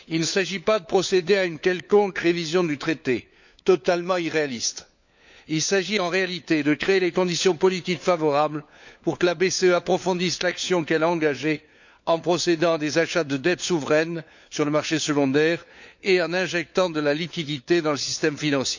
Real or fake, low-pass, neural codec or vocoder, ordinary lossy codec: fake; 7.2 kHz; codec, 16 kHz, 4 kbps, FunCodec, trained on LibriTTS, 50 frames a second; none